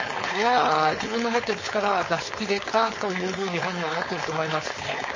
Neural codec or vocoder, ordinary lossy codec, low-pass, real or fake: codec, 16 kHz, 4.8 kbps, FACodec; MP3, 32 kbps; 7.2 kHz; fake